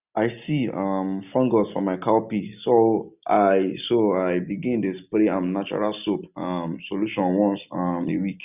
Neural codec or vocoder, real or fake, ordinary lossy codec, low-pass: none; real; none; 3.6 kHz